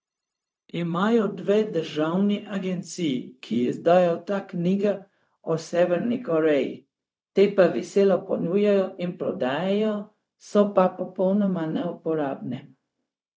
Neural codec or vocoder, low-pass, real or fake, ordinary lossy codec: codec, 16 kHz, 0.4 kbps, LongCat-Audio-Codec; none; fake; none